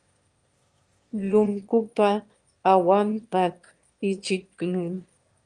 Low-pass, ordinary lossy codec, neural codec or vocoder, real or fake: 9.9 kHz; Opus, 32 kbps; autoencoder, 22.05 kHz, a latent of 192 numbers a frame, VITS, trained on one speaker; fake